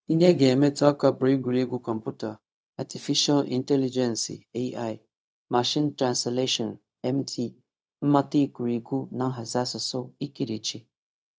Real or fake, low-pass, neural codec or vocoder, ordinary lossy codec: fake; none; codec, 16 kHz, 0.4 kbps, LongCat-Audio-Codec; none